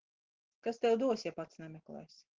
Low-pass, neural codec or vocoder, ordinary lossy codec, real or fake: 7.2 kHz; none; Opus, 16 kbps; real